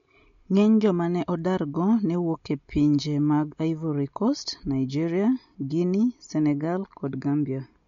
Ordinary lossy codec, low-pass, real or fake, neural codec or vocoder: MP3, 48 kbps; 7.2 kHz; real; none